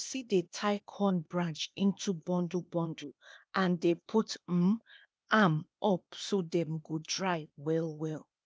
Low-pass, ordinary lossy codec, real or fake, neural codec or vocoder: none; none; fake; codec, 16 kHz, 0.8 kbps, ZipCodec